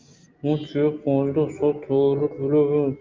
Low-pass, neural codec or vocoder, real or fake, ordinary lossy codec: 7.2 kHz; none; real; Opus, 24 kbps